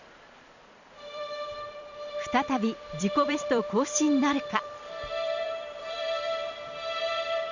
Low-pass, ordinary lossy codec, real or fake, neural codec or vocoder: 7.2 kHz; none; fake; vocoder, 44.1 kHz, 128 mel bands every 256 samples, BigVGAN v2